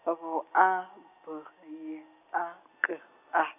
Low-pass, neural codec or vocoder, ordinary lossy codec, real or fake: 3.6 kHz; none; none; real